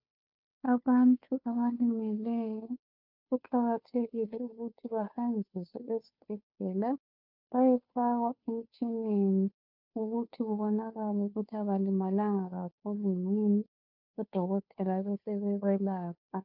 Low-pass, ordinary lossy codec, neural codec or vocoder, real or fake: 5.4 kHz; MP3, 48 kbps; codec, 16 kHz, 2 kbps, FunCodec, trained on Chinese and English, 25 frames a second; fake